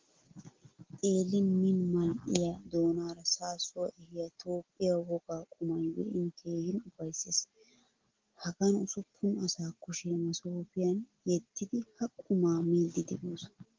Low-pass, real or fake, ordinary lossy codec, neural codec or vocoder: 7.2 kHz; real; Opus, 16 kbps; none